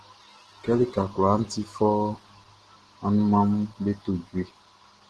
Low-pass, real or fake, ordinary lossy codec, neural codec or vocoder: 10.8 kHz; real; Opus, 16 kbps; none